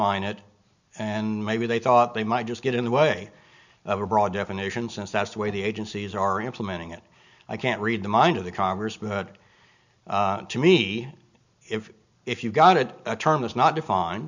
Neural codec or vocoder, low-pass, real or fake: none; 7.2 kHz; real